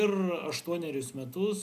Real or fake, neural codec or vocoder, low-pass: real; none; 14.4 kHz